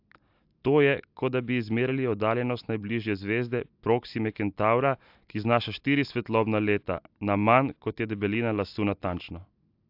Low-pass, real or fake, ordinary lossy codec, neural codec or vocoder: 5.4 kHz; real; AAC, 48 kbps; none